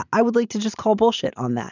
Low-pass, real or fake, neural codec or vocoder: 7.2 kHz; real; none